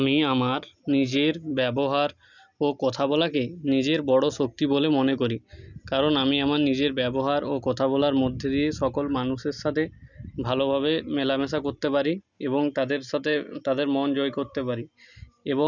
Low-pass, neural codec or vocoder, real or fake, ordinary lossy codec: 7.2 kHz; none; real; none